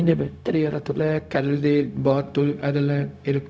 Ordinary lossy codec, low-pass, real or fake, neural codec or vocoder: none; none; fake; codec, 16 kHz, 0.4 kbps, LongCat-Audio-Codec